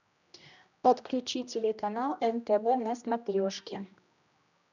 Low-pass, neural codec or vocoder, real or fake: 7.2 kHz; codec, 16 kHz, 1 kbps, X-Codec, HuBERT features, trained on general audio; fake